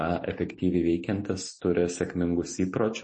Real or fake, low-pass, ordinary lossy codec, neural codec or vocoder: real; 10.8 kHz; MP3, 32 kbps; none